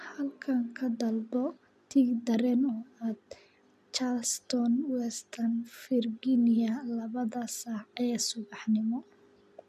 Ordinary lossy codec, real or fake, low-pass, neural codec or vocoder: none; real; 14.4 kHz; none